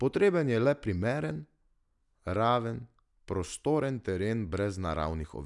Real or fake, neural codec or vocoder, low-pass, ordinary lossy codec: real; none; 10.8 kHz; none